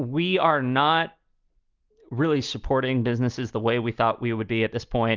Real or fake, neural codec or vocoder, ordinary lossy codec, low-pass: real; none; Opus, 24 kbps; 7.2 kHz